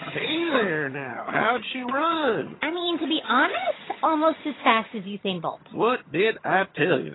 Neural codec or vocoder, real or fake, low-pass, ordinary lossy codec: vocoder, 22.05 kHz, 80 mel bands, HiFi-GAN; fake; 7.2 kHz; AAC, 16 kbps